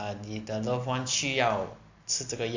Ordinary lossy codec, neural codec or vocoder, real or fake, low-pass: AAC, 48 kbps; none; real; 7.2 kHz